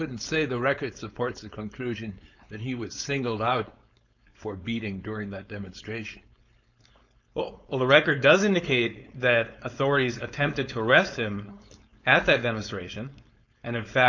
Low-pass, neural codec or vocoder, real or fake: 7.2 kHz; codec, 16 kHz, 4.8 kbps, FACodec; fake